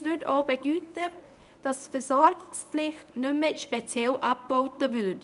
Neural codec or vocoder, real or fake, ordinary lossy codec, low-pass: codec, 24 kHz, 0.9 kbps, WavTokenizer, medium speech release version 1; fake; none; 10.8 kHz